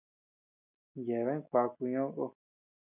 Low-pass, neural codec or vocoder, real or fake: 3.6 kHz; none; real